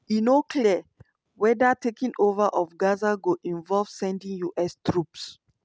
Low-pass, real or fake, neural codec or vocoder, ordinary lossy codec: none; real; none; none